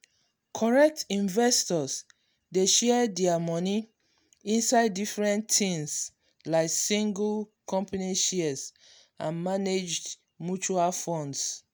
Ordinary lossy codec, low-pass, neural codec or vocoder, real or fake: none; none; none; real